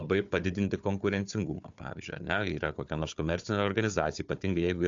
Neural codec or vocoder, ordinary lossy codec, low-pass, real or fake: codec, 16 kHz, 4 kbps, FunCodec, trained on Chinese and English, 50 frames a second; AAC, 64 kbps; 7.2 kHz; fake